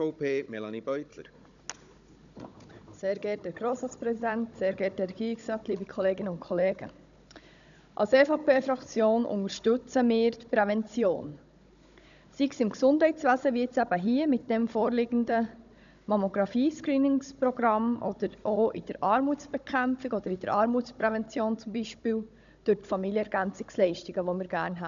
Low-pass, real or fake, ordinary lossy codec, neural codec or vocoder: 7.2 kHz; fake; none; codec, 16 kHz, 16 kbps, FunCodec, trained on Chinese and English, 50 frames a second